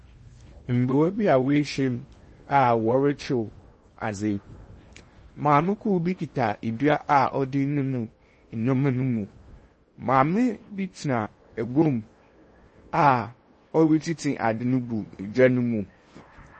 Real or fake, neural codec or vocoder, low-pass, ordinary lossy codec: fake; codec, 16 kHz in and 24 kHz out, 0.8 kbps, FocalCodec, streaming, 65536 codes; 10.8 kHz; MP3, 32 kbps